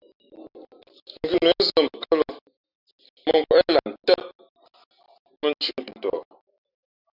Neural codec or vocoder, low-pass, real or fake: none; 5.4 kHz; real